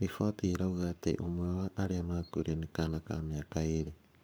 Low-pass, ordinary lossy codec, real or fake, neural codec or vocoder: none; none; fake; codec, 44.1 kHz, 7.8 kbps, Pupu-Codec